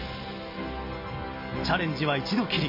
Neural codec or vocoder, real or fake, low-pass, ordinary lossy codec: none; real; 5.4 kHz; MP3, 24 kbps